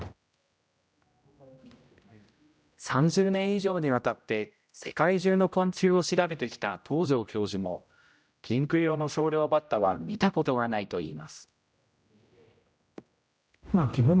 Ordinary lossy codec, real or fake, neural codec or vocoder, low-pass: none; fake; codec, 16 kHz, 0.5 kbps, X-Codec, HuBERT features, trained on general audio; none